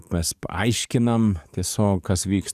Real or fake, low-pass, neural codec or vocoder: fake; 14.4 kHz; codec, 44.1 kHz, 7.8 kbps, DAC